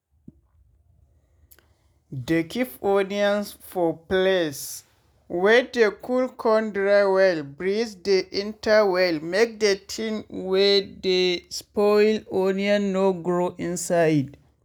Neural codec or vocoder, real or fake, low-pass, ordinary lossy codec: none; real; none; none